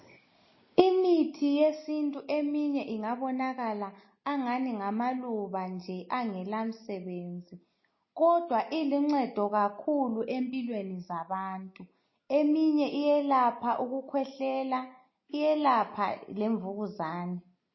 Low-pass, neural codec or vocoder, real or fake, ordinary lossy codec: 7.2 kHz; none; real; MP3, 24 kbps